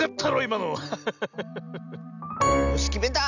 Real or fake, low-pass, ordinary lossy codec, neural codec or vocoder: real; 7.2 kHz; none; none